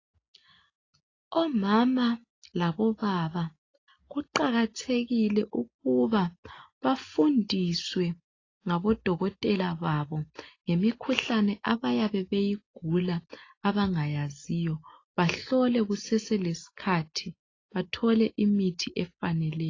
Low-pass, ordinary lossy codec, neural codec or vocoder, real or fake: 7.2 kHz; AAC, 32 kbps; none; real